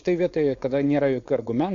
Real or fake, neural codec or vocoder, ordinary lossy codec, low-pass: fake; codec, 16 kHz, 4.8 kbps, FACodec; AAC, 48 kbps; 7.2 kHz